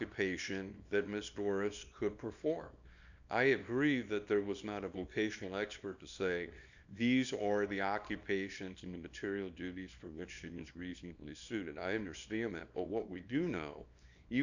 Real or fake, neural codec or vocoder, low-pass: fake; codec, 24 kHz, 0.9 kbps, WavTokenizer, small release; 7.2 kHz